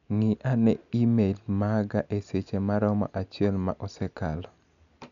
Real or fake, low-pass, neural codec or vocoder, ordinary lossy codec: real; 7.2 kHz; none; none